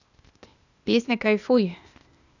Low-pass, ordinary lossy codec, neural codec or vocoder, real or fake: 7.2 kHz; none; codec, 16 kHz, 0.8 kbps, ZipCodec; fake